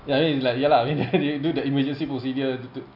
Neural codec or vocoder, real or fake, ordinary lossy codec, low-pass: none; real; none; 5.4 kHz